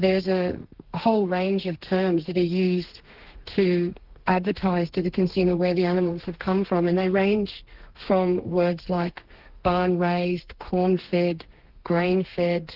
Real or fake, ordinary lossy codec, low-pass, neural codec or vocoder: fake; Opus, 16 kbps; 5.4 kHz; codec, 32 kHz, 1.9 kbps, SNAC